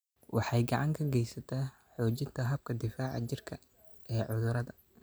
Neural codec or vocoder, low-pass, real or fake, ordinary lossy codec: none; none; real; none